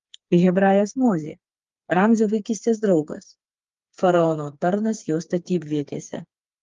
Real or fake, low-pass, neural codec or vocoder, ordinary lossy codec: fake; 7.2 kHz; codec, 16 kHz, 4 kbps, FreqCodec, smaller model; Opus, 32 kbps